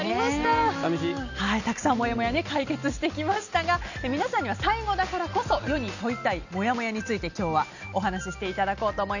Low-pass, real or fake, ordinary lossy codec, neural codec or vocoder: 7.2 kHz; real; none; none